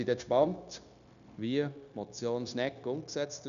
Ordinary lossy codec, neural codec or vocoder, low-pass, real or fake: none; codec, 16 kHz, 0.9 kbps, LongCat-Audio-Codec; 7.2 kHz; fake